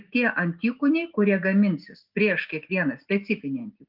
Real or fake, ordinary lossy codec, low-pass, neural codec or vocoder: real; Opus, 32 kbps; 5.4 kHz; none